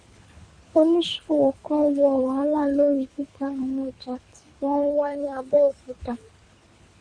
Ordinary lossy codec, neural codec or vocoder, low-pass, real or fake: Opus, 64 kbps; codec, 24 kHz, 3 kbps, HILCodec; 9.9 kHz; fake